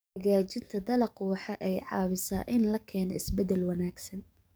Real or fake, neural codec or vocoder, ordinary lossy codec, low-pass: fake; codec, 44.1 kHz, 7.8 kbps, DAC; none; none